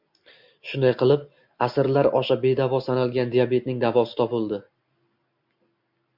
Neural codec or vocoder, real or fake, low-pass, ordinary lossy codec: none; real; 5.4 kHz; MP3, 48 kbps